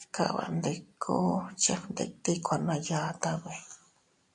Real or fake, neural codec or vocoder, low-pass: real; none; 10.8 kHz